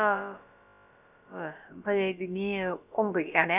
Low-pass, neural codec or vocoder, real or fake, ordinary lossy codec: 3.6 kHz; codec, 16 kHz, about 1 kbps, DyCAST, with the encoder's durations; fake; none